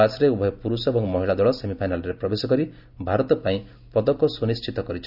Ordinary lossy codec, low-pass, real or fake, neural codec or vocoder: none; 5.4 kHz; real; none